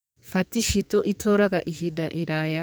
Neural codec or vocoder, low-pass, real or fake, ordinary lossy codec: codec, 44.1 kHz, 2.6 kbps, SNAC; none; fake; none